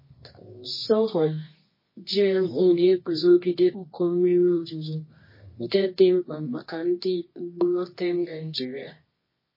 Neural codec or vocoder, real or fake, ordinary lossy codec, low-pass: codec, 24 kHz, 0.9 kbps, WavTokenizer, medium music audio release; fake; MP3, 24 kbps; 5.4 kHz